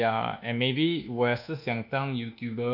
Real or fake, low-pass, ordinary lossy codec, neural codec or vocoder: fake; 5.4 kHz; none; codec, 24 kHz, 1.2 kbps, DualCodec